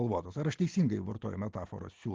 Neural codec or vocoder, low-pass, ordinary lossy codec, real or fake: none; 7.2 kHz; Opus, 16 kbps; real